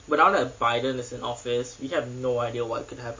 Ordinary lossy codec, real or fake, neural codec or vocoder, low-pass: MP3, 32 kbps; real; none; 7.2 kHz